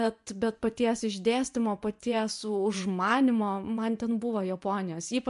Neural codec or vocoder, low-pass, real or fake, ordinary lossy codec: vocoder, 24 kHz, 100 mel bands, Vocos; 10.8 kHz; fake; MP3, 64 kbps